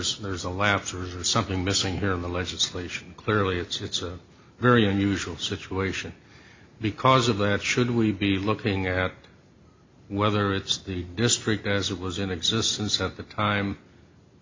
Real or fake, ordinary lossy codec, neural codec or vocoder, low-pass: real; MP3, 64 kbps; none; 7.2 kHz